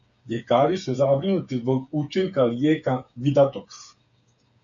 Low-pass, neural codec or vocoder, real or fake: 7.2 kHz; codec, 16 kHz, 8 kbps, FreqCodec, smaller model; fake